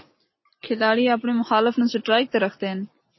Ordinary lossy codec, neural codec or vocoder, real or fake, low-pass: MP3, 24 kbps; none; real; 7.2 kHz